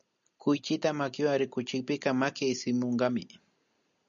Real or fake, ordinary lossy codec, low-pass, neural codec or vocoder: real; MP3, 96 kbps; 7.2 kHz; none